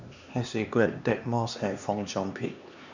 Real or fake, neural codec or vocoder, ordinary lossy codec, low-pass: fake; codec, 16 kHz, 2 kbps, X-Codec, HuBERT features, trained on LibriSpeech; none; 7.2 kHz